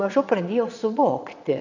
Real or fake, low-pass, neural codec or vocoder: fake; 7.2 kHz; vocoder, 22.05 kHz, 80 mel bands, Vocos